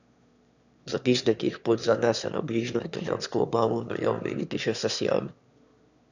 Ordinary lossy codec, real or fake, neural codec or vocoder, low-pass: none; fake; autoencoder, 22.05 kHz, a latent of 192 numbers a frame, VITS, trained on one speaker; 7.2 kHz